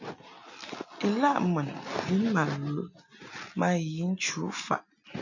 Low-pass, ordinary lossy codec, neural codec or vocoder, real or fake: 7.2 kHz; AAC, 48 kbps; none; real